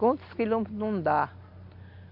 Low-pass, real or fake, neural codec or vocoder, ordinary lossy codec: 5.4 kHz; real; none; MP3, 48 kbps